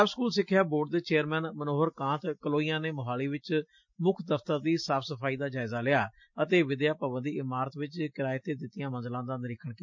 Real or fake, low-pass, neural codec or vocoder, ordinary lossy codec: real; 7.2 kHz; none; MP3, 48 kbps